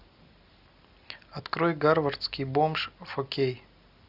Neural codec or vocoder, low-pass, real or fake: none; 5.4 kHz; real